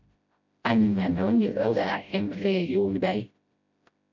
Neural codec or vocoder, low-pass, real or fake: codec, 16 kHz, 0.5 kbps, FreqCodec, smaller model; 7.2 kHz; fake